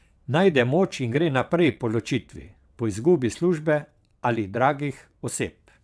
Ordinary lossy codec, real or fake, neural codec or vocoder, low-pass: none; fake; vocoder, 22.05 kHz, 80 mel bands, WaveNeXt; none